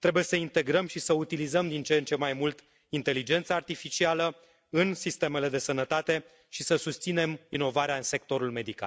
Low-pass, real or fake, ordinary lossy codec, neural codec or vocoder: none; real; none; none